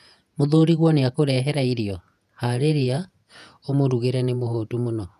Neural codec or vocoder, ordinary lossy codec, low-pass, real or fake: vocoder, 48 kHz, 128 mel bands, Vocos; none; 14.4 kHz; fake